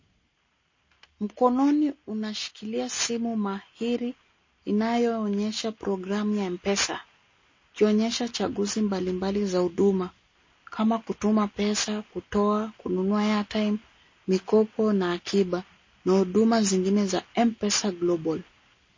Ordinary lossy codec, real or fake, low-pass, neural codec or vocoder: MP3, 32 kbps; real; 7.2 kHz; none